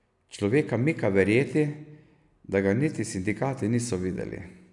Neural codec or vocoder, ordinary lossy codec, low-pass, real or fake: none; none; 10.8 kHz; real